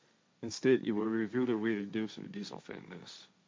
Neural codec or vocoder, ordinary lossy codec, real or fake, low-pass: codec, 16 kHz, 1.1 kbps, Voila-Tokenizer; none; fake; none